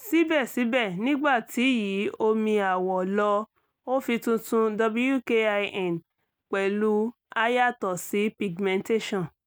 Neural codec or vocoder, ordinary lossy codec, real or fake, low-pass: none; none; real; none